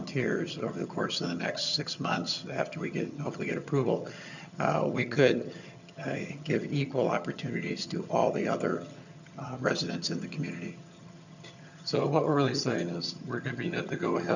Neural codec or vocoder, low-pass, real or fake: vocoder, 22.05 kHz, 80 mel bands, HiFi-GAN; 7.2 kHz; fake